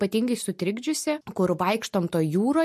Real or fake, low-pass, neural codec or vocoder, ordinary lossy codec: real; 14.4 kHz; none; MP3, 64 kbps